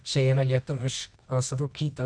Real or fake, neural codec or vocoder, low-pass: fake; codec, 24 kHz, 0.9 kbps, WavTokenizer, medium music audio release; 9.9 kHz